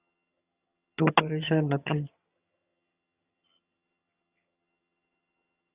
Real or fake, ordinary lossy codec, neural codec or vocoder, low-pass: fake; Opus, 64 kbps; vocoder, 22.05 kHz, 80 mel bands, HiFi-GAN; 3.6 kHz